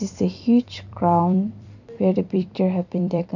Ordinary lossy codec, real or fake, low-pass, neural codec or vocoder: none; fake; 7.2 kHz; vocoder, 44.1 kHz, 128 mel bands every 256 samples, BigVGAN v2